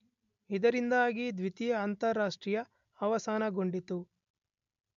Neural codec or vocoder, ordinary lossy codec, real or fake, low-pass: none; MP3, 64 kbps; real; 7.2 kHz